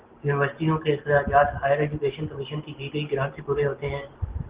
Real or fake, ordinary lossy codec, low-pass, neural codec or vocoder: real; Opus, 16 kbps; 3.6 kHz; none